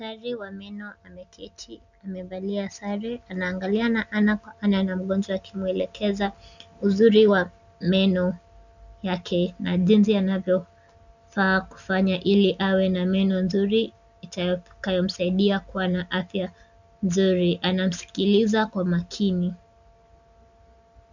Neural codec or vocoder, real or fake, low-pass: none; real; 7.2 kHz